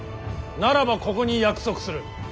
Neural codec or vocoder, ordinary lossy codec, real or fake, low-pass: none; none; real; none